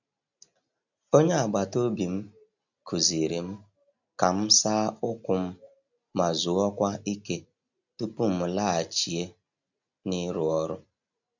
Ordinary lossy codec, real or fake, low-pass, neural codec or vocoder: none; real; 7.2 kHz; none